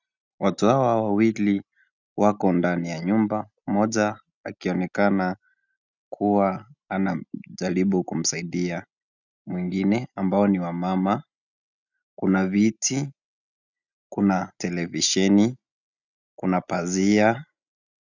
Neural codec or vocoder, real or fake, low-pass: none; real; 7.2 kHz